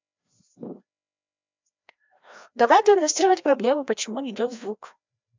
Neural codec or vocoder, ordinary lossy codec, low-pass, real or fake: codec, 16 kHz, 1 kbps, FreqCodec, larger model; MP3, 64 kbps; 7.2 kHz; fake